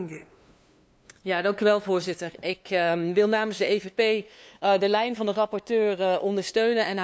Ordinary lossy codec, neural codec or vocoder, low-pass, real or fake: none; codec, 16 kHz, 2 kbps, FunCodec, trained on LibriTTS, 25 frames a second; none; fake